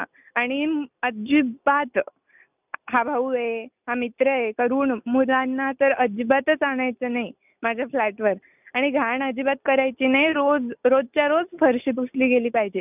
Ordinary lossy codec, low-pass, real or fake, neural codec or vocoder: none; 3.6 kHz; real; none